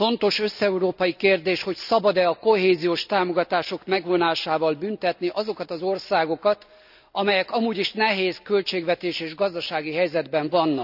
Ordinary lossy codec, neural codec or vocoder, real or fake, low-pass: none; none; real; 5.4 kHz